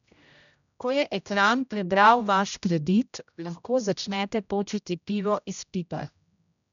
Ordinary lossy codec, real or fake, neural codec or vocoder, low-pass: none; fake; codec, 16 kHz, 0.5 kbps, X-Codec, HuBERT features, trained on general audio; 7.2 kHz